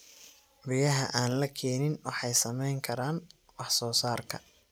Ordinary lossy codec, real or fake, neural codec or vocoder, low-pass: none; real; none; none